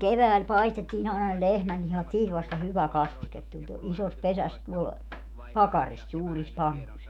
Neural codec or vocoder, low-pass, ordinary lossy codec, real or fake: autoencoder, 48 kHz, 128 numbers a frame, DAC-VAE, trained on Japanese speech; 19.8 kHz; none; fake